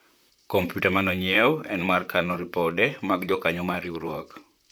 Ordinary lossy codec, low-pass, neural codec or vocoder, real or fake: none; none; vocoder, 44.1 kHz, 128 mel bands, Pupu-Vocoder; fake